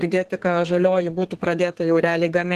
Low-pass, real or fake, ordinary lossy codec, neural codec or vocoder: 14.4 kHz; fake; Opus, 16 kbps; codec, 32 kHz, 1.9 kbps, SNAC